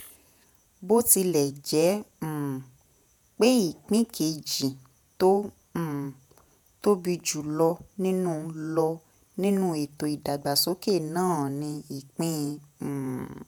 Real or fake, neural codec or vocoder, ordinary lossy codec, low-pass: fake; vocoder, 48 kHz, 128 mel bands, Vocos; none; none